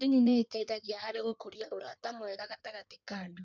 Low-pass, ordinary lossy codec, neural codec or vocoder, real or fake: 7.2 kHz; none; codec, 16 kHz in and 24 kHz out, 1.1 kbps, FireRedTTS-2 codec; fake